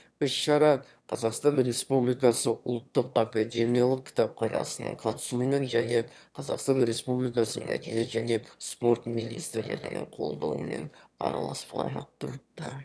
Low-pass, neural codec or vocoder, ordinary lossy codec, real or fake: none; autoencoder, 22.05 kHz, a latent of 192 numbers a frame, VITS, trained on one speaker; none; fake